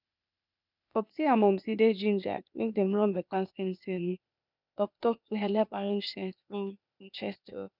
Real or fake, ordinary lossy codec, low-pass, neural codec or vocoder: fake; none; 5.4 kHz; codec, 16 kHz, 0.8 kbps, ZipCodec